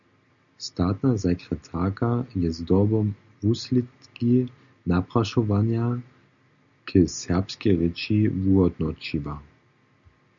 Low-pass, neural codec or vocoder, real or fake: 7.2 kHz; none; real